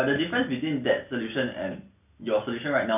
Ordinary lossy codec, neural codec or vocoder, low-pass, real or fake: none; none; 3.6 kHz; real